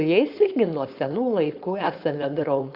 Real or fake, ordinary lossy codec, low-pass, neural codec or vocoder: fake; Opus, 64 kbps; 5.4 kHz; codec, 16 kHz, 4.8 kbps, FACodec